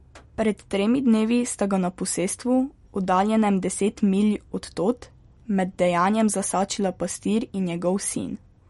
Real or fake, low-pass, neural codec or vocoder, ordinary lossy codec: real; 19.8 kHz; none; MP3, 48 kbps